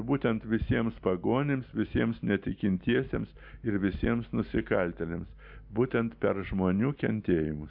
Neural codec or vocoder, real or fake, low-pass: none; real; 5.4 kHz